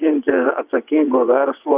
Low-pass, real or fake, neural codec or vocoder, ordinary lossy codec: 3.6 kHz; fake; vocoder, 22.05 kHz, 80 mel bands, WaveNeXt; Opus, 64 kbps